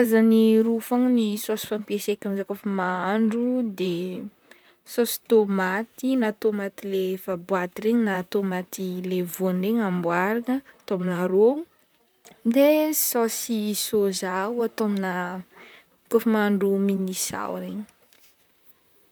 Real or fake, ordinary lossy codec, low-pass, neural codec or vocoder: fake; none; none; vocoder, 44.1 kHz, 128 mel bands, Pupu-Vocoder